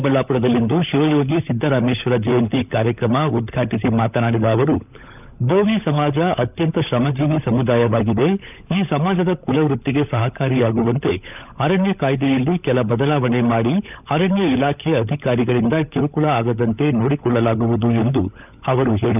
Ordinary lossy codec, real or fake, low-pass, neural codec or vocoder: none; fake; 3.6 kHz; codec, 16 kHz, 16 kbps, FunCodec, trained on LibriTTS, 50 frames a second